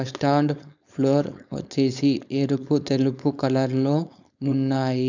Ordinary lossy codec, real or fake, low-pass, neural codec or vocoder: none; fake; 7.2 kHz; codec, 16 kHz, 4.8 kbps, FACodec